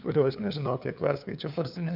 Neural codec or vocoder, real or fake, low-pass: codec, 16 kHz, 2 kbps, FunCodec, trained on LibriTTS, 25 frames a second; fake; 5.4 kHz